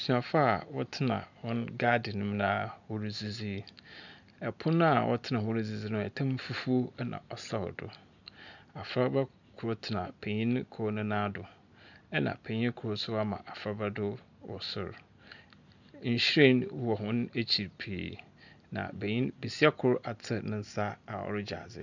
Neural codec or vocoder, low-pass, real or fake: none; 7.2 kHz; real